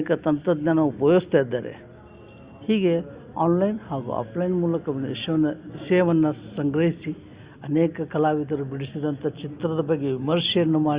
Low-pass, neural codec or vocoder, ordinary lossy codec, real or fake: 3.6 kHz; none; Opus, 64 kbps; real